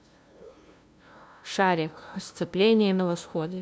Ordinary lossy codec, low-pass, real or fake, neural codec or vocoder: none; none; fake; codec, 16 kHz, 0.5 kbps, FunCodec, trained on LibriTTS, 25 frames a second